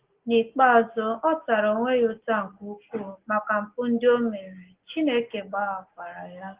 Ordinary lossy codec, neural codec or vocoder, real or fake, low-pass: Opus, 16 kbps; none; real; 3.6 kHz